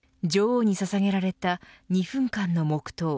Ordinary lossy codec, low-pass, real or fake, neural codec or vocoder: none; none; real; none